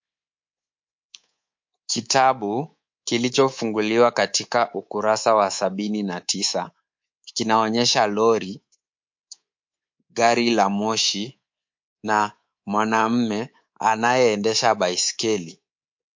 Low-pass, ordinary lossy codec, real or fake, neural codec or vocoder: 7.2 kHz; MP3, 48 kbps; fake; codec, 24 kHz, 3.1 kbps, DualCodec